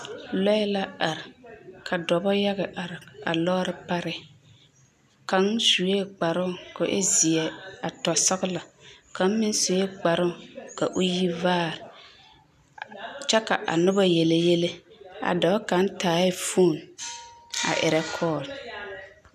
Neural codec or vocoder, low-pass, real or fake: none; 14.4 kHz; real